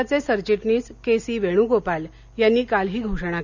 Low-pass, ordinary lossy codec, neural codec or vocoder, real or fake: 7.2 kHz; none; none; real